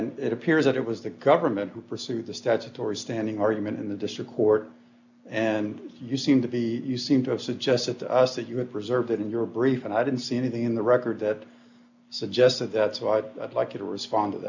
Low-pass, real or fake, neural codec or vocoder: 7.2 kHz; real; none